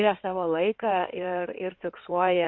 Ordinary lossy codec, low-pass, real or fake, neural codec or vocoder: AAC, 48 kbps; 7.2 kHz; fake; codec, 16 kHz in and 24 kHz out, 2.2 kbps, FireRedTTS-2 codec